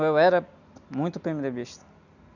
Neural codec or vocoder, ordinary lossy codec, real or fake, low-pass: none; none; real; 7.2 kHz